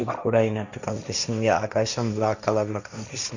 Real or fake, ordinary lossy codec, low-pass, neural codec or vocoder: fake; none; 7.2 kHz; codec, 16 kHz, 1.1 kbps, Voila-Tokenizer